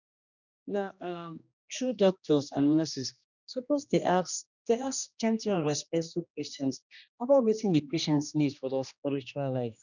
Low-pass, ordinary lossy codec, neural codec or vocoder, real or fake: 7.2 kHz; none; codec, 16 kHz, 1 kbps, X-Codec, HuBERT features, trained on general audio; fake